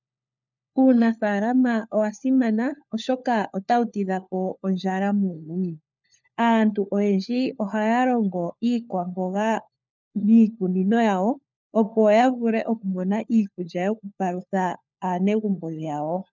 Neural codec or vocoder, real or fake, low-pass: codec, 16 kHz, 4 kbps, FunCodec, trained on LibriTTS, 50 frames a second; fake; 7.2 kHz